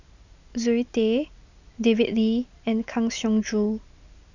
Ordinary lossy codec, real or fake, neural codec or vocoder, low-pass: none; real; none; 7.2 kHz